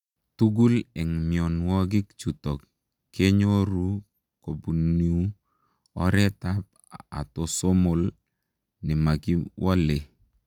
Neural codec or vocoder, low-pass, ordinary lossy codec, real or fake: none; 19.8 kHz; none; real